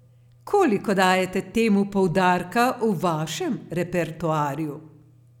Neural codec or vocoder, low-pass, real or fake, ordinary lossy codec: none; 19.8 kHz; real; none